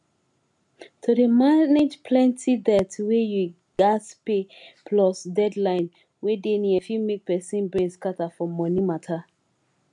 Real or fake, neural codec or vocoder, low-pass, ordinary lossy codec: real; none; 10.8 kHz; MP3, 64 kbps